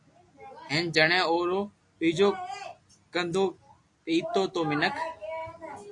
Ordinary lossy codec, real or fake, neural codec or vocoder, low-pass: AAC, 64 kbps; real; none; 10.8 kHz